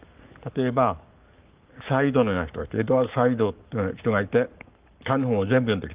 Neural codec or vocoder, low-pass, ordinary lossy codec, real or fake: codec, 44.1 kHz, 7.8 kbps, Pupu-Codec; 3.6 kHz; Opus, 24 kbps; fake